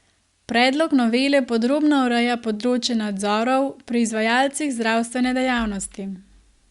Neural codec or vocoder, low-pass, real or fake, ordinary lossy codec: none; 10.8 kHz; real; Opus, 64 kbps